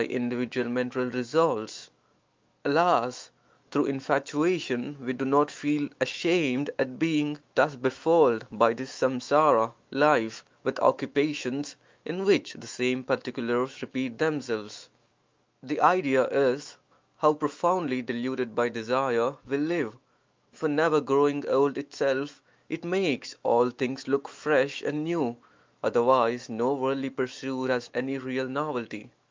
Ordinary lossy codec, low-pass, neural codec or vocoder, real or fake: Opus, 24 kbps; 7.2 kHz; autoencoder, 48 kHz, 128 numbers a frame, DAC-VAE, trained on Japanese speech; fake